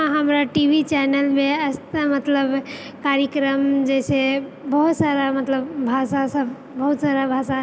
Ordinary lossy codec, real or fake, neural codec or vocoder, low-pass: none; real; none; none